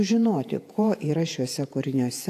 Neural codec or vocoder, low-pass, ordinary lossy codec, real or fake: vocoder, 48 kHz, 128 mel bands, Vocos; 14.4 kHz; AAC, 64 kbps; fake